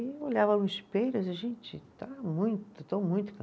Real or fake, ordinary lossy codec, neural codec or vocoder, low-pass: real; none; none; none